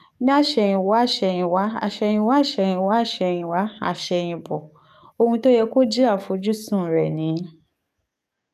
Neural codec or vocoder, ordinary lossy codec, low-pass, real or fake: codec, 44.1 kHz, 7.8 kbps, DAC; none; 14.4 kHz; fake